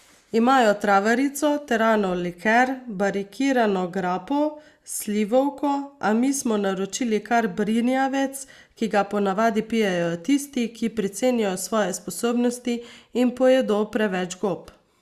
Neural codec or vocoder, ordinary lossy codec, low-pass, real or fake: none; Opus, 64 kbps; 14.4 kHz; real